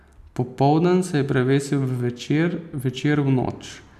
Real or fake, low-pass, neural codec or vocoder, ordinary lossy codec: real; 14.4 kHz; none; none